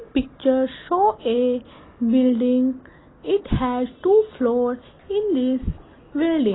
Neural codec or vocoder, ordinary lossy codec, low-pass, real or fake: none; AAC, 16 kbps; 7.2 kHz; real